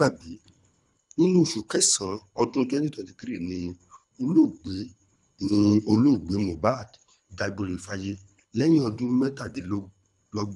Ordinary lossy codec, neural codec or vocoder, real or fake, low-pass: none; codec, 24 kHz, 3 kbps, HILCodec; fake; 10.8 kHz